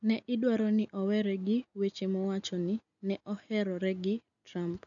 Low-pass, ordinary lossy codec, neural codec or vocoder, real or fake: 7.2 kHz; none; none; real